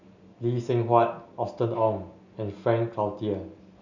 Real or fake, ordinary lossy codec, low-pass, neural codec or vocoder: real; none; 7.2 kHz; none